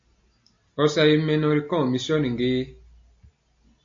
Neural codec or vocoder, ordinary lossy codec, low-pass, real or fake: none; AAC, 64 kbps; 7.2 kHz; real